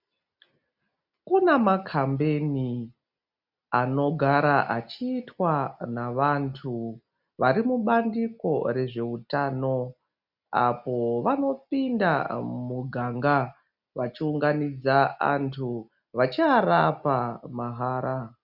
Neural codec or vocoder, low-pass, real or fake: none; 5.4 kHz; real